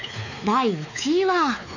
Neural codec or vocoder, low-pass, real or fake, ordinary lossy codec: codec, 16 kHz, 4 kbps, X-Codec, WavLM features, trained on Multilingual LibriSpeech; 7.2 kHz; fake; none